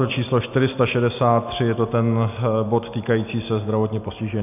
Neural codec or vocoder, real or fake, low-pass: none; real; 3.6 kHz